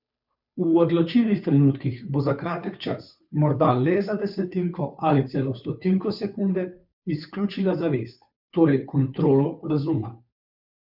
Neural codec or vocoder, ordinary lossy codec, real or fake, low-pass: codec, 16 kHz, 2 kbps, FunCodec, trained on Chinese and English, 25 frames a second; none; fake; 5.4 kHz